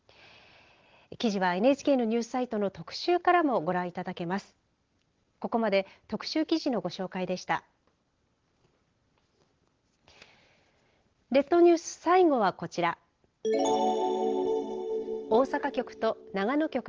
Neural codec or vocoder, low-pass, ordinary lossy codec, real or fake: none; 7.2 kHz; Opus, 16 kbps; real